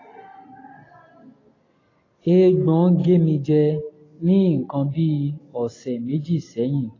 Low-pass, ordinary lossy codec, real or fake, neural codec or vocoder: 7.2 kHz; AAC, 32 kbps; real; none